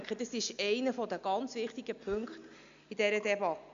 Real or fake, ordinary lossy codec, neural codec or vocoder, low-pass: real; MP3, 64 kbps; none; 7.2 kHz